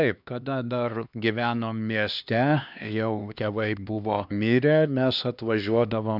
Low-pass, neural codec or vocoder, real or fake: 5.4 kHz; codec, 16 kHz, 2 kbps, X-Codec, HuBERT features, trained on LibriSpeech; fake